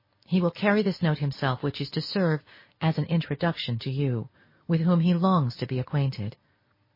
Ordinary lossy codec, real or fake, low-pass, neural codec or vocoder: MP3, 24 kbps; real; 5.4 kHz; none